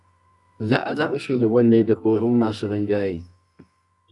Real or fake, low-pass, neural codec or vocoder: fake; 10.8 kHz; codec, 24 kHz, 0.9 kbps, WavTokenizer, medium music audio release